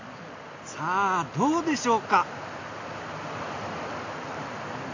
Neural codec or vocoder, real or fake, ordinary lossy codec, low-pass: none; real; AAC, 48 kbps; 7.2 kHz